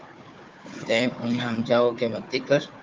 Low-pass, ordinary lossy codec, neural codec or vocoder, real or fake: 7.2 kHz; Opus, 24 kbps; codec, 16 kHz, 4 kbps, FunCodec, trained on Chinese and English, 50 frames a second; fake